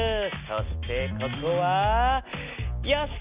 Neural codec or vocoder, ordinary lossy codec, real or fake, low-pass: none; Opus, 64 kbps; real; 3.6 kHz